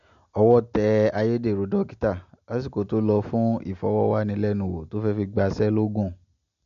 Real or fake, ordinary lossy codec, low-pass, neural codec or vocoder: real; MP3, 48 kbps; 7.2 kHz; none